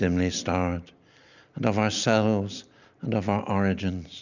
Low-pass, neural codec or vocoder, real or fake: 7.2 kHz; none; real